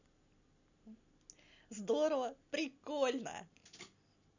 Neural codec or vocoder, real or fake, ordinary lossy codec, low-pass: none; real; none; 7.2 kHz